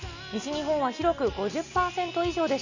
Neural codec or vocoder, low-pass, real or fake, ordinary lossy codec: none; 7.2 kHz; real; none